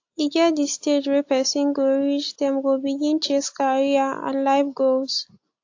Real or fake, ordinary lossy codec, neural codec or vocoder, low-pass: real; AAC, 48 kbps; none; 7.2 kHz